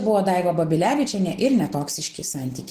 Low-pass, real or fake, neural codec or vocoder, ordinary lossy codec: 14.4 kHz; real; none; Opus, 16 kbps